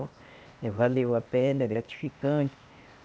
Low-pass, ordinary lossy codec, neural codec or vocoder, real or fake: none; none; codec, 16 kHz, 0.8 kbps, ZipCodec; fake